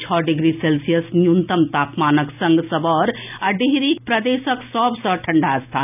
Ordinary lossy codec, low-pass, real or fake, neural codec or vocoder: none; 3.6 kHz; real; none